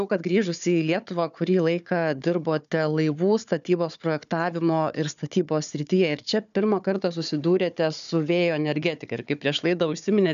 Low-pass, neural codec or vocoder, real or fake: 7.2 kHz; codec, 16 kHz, 4 kbps, FunCodec, trained on Chinese and English, 50 frames a second; fake